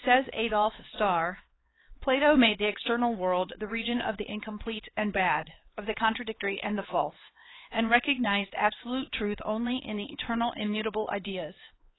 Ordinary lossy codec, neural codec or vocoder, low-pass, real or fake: AAC, 16 kbps; codec, 16 kHz, 4 kbps, X-Codec, HuBERT features, trained on LibriSpeech; 7.2 kHz; fake